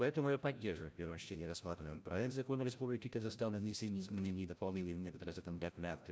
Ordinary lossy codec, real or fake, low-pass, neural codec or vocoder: none; fake; none; codec, 16 kHz, 0.5 kbps, FreqCodec, larger model